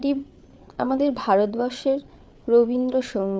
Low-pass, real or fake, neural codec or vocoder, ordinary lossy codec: none; fake; codec, 16 kHz, 8 kbps, FreqCodec, larger model; none